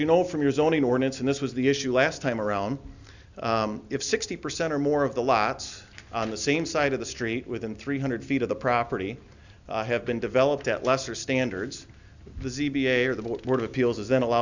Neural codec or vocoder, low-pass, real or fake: none; 7.2 kHz; real